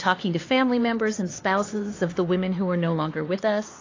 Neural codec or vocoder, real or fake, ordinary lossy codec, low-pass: codec, 16 kHz, 4 kbps, X-Codec, HuBERT features, trained on LibriSpeech; fake; AAC, 32 kbps; 7.2 kHz